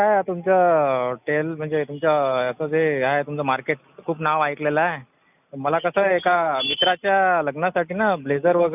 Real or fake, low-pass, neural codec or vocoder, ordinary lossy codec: real; 3.6 kHz; none; none